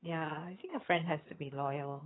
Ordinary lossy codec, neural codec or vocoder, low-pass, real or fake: AAC, 16 kbps; vocoder, 22.05 kHz, 80 mel bands, HiFi-GAN; 7.2 kHz; fake